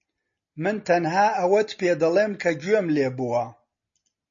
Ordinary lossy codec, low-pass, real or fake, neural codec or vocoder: MP3, 32 kbps; 7.2 kHz; real; none